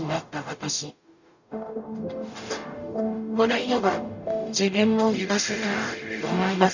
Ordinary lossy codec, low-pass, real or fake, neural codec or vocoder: none; 7.2 kHz; fake; codec, 44.1 kHz, 0.9 kbps, DAC